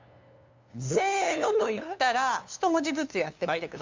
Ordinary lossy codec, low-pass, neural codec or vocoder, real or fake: MP3, 64 kbps; 7.2 kHz; codec, 16 kHz, 2 kbps, FunCodec, trained on LibriTTS, 25 frames a second; fake